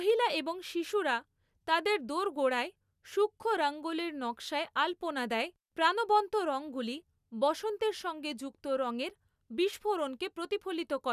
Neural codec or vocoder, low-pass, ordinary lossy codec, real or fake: none; 14.4 kHz; none; real